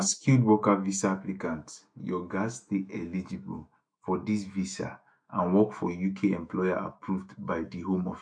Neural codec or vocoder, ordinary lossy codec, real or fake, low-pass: autoencoder, 48 kHz, 128 numbers a frame, DAC-VAE, trained on Japanese speech; MP3, 64 kbps; fake; 9.9 kHz